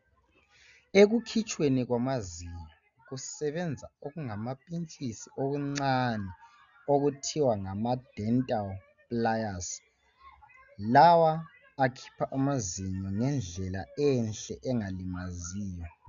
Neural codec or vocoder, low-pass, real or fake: none; 7.2 kHz; real